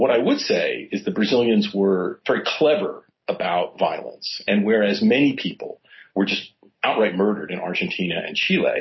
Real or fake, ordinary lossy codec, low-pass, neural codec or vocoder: real; MP3, 24 kbps; 7.2 kHz; none